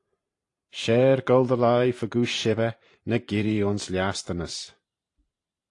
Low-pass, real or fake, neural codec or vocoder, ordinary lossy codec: 10.8 kHz; fake; vocoder, 44.1 kHz, 128 mel bands every 512 samples, BigVGAN v2; AAC, 48 kbps